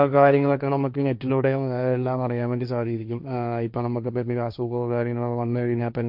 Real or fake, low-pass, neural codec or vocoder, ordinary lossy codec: fake; 5.4 kHz; codec, 16 kHz, 1.1 kbps, Voila-Tokenizer; none